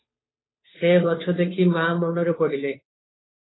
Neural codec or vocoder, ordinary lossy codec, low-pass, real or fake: codec, 16 kHz, 2 kbps, FunCodec, trained on Chinese and English, 25 frames a second; AAC, 16 kbps; 7.2 kHz; fake